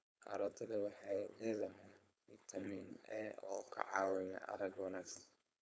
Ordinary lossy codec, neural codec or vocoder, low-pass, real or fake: none; codec, 16 kHz, 4.8 kbps, FACodec; none; fake